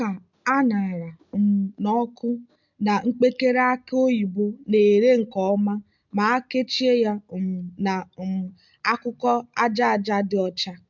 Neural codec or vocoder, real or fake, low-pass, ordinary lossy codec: none; real; 7.2 kHz; MP3, 64 kbps